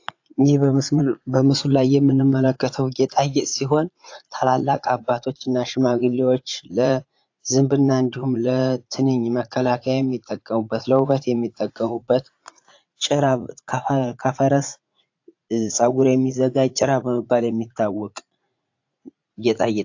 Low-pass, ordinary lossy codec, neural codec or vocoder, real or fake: 7.2 kHz; AAC, 48 kbps; vocoder, 44.1 kHz, 80 mel bands, Vocos; fake